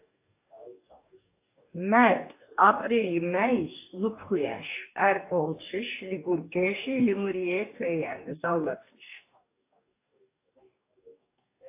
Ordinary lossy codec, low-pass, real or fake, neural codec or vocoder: AAC, 24 kbps; 3.6 kHz; fake; codec, 44.1 kHz, 2.6 kbps, DAC